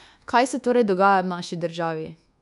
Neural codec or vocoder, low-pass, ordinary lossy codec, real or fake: codec, 24 kHz, 1.2 kbps, DualCodec; 10.8 kHz; none; fake